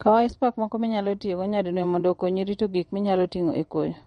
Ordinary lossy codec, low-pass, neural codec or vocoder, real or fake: MP3, 48 kbps; 9.9 kHz; vocoder, 22.05 kHz, 80 mel bands, WaveNeXt; fake